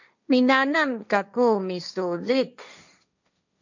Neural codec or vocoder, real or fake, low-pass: codec, 16 kHz, 1.1 kbps, Voila-Tokenizer; fake; 7.2 kHz